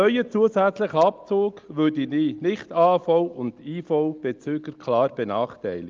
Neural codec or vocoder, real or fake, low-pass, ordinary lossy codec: none; real; 7.2 kHz; Opus, 32 kbps